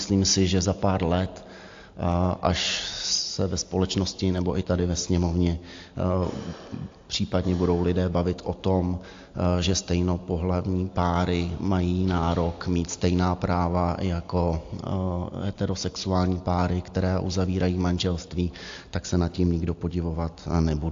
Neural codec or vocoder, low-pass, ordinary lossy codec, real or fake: none; 7.2 kHz; AAC, 48 kbps; real